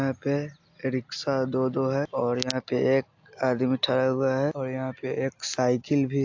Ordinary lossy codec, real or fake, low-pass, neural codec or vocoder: AAC, 48 kbps; real; 7.2 kHz; none